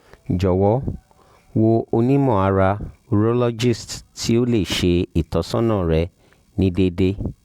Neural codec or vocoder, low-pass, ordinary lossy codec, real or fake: none; 19.8 kHz; Opus, 64 kbps; real